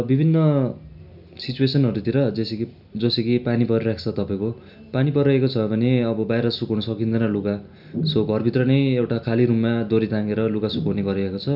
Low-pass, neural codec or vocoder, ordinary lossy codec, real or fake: 5.4 kHz; none; none; real